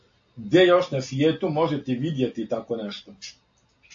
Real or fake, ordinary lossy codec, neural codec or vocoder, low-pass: real; AAC, 48 kbps; none; 7.2 kHz